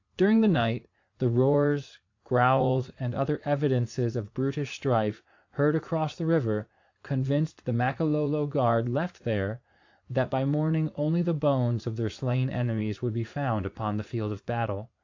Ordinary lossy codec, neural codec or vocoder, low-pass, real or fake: AAC, 48 kbps; vocoder, 44.1 kHz, 80 mel bands, Vocos; 7.2 kHz; fake